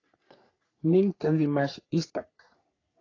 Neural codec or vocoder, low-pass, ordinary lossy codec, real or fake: codec, 44.1 kHz, 3.4 kbps, Pupu-Codec; 7.2 kHz; AAC, 32 kbps; fake